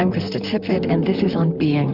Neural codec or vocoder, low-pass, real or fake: vocoder, 44.1 kHz, 128 mel bands, Pupu-Vocoder; 5.4 kHz; fake